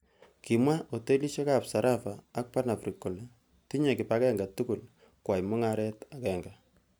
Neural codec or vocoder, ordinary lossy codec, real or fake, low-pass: none; none; real; none